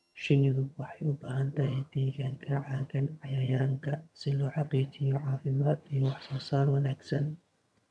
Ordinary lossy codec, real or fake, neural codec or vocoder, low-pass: none; fake; vocoder, 22.05 kHz, 80 mel bands, HiFi-GAN; none